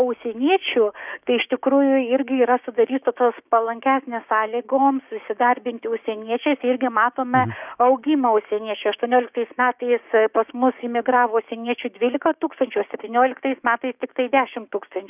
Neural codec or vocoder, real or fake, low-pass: codec, 16 kHz, 6 kbps, DAC; fake; 3.6 kHz